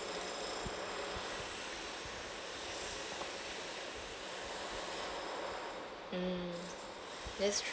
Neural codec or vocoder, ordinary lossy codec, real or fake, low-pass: none; none; real; none